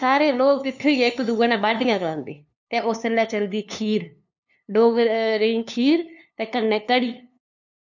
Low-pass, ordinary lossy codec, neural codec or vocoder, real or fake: 7.2 kHz; none; codec, 16 kHz, 2 kbps, FunCodec, trained on LibriTTS, 25 frames a second; fake